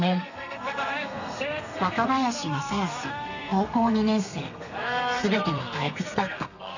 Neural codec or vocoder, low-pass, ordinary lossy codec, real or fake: codec, 32 kHz, 1.9 kbps, SNAC; 7.2 kHz; none; fake